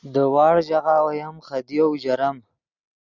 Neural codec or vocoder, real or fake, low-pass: none; real; 7.2 kHz